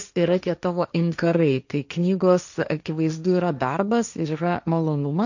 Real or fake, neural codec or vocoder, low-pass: fake; codec, 16 kHz, 1.1 kbps, Voila-Tokenizer; 7.2 kHz